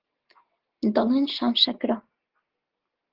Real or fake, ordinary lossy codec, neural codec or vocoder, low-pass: real; Opus, 16 kbps; none; 5.4 kHz